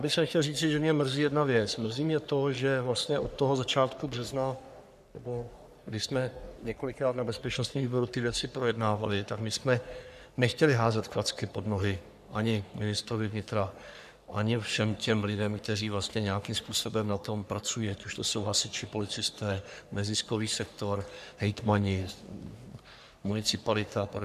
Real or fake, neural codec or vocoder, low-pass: fake; codec, 44.1 kHz, 3.4 kbps, Pupu-Codec; 14.4 kHz